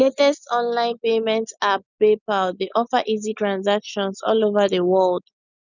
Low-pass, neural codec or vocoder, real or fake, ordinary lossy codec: 7.2 kHz; none; real; none